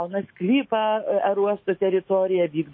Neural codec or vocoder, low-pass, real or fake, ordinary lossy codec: autoencoder, 48 kHz, 128 numbers a frame, DAC-VAE, trained on Japanese speech; 7.2 kHz; fake; MP3, 24 kbps